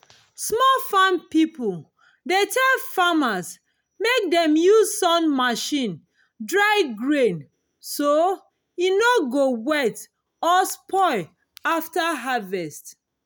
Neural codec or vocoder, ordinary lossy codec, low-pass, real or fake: none; none; none; real